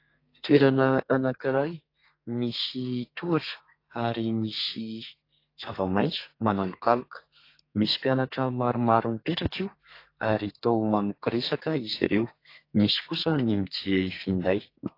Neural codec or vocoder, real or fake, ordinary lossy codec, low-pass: codec, 32 kHz, 1.9 kbps, SNAC; fake; AAC, 32 kbps; 5.4 kHz